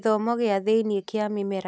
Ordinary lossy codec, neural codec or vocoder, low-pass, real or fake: none; none; none; real